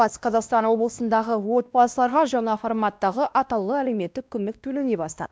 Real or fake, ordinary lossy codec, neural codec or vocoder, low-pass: fake; none; codec, 16 kHz, 1 kbps, X-Codec, WavLM features, trained on Multilingual LibriSpeech; none